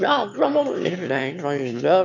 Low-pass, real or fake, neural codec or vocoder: 7.2 kHz; fake; autoencoder, 22.05 kHz, a latent of 192 numbers a frame, VITS, trained on one speaker